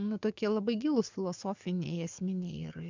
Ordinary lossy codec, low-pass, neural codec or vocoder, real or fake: MP3, 64 kbps; 7.2 kHz; codec, 44.1 kHz, 7.8 kbps, DAC; fake